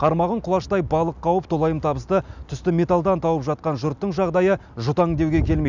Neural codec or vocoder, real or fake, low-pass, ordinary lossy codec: none; real; 7.2 kHz; none